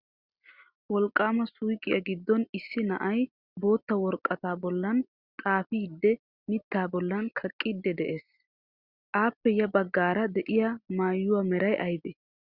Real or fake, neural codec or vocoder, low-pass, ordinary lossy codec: real; none; 5.4 kHz; Opus, 64 kbps